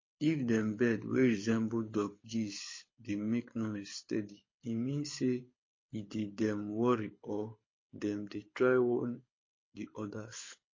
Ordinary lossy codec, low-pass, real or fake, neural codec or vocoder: MP3, 32 kbps; 7.2 kHz; fake; codec, 24 kHz, 6 kbps, HILCodec